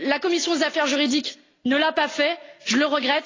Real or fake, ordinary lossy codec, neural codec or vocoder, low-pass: real; AAC, 32 kbps; none; 7.2 kHz